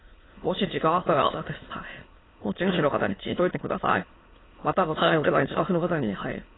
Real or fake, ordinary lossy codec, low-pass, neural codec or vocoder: fake; AAC, 16 kbps; 7.2 kHz; autoencoder, 22.05 kHz, a latent of 192 numbers a frame, VITS, trained on many speakers